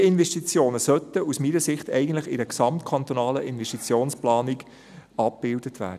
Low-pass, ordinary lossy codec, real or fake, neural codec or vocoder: 14.4 kHz; none; real; none